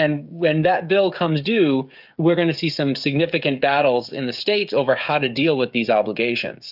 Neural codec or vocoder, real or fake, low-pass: codec, 16 kHz, 16 kbps, FreqCodec, smaller model; fake; 5.4 kHz